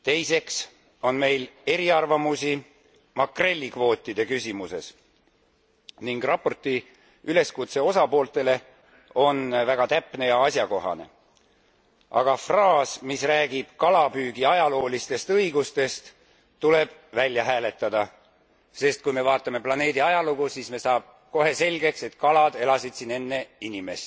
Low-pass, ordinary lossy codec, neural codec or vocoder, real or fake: none; none; none; real